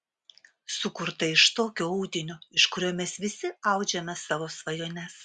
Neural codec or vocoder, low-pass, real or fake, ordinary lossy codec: none; 10.8 kHz; real; Opus, 64 kbps